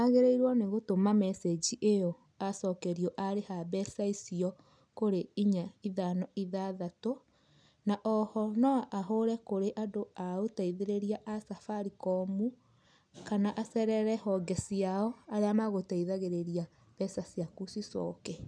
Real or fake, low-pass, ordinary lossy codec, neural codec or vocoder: real; 9.9 kHz; none; none